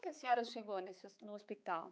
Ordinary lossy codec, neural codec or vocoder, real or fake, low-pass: none; codec, 16 kHz, 4 kbps, X-Codec, HuBERT features, trained on balanced general audio; fake; none